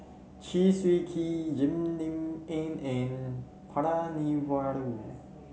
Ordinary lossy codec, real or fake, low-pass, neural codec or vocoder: none; real; none; none